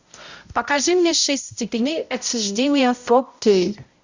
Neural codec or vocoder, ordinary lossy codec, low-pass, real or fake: codec, 16 kHz, 0.5 kbps, X-Codec, HuBERT features, trained on balanced general audio; Opus, 64 kbps; 7.2 kHz; fake